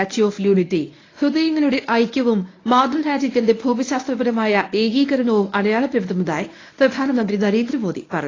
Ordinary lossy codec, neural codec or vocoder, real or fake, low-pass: AAC, 32 kbps; codec, 24 kHz, 0.9 kbps, WavTokenizer, medium speech release version 1; fake; 7.2 kHz